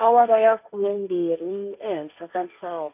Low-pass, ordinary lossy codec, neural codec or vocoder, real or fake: 3.6 kHz; none; codec, 16 kHz, 1.1 kbps, Voila-Tokenizer; fake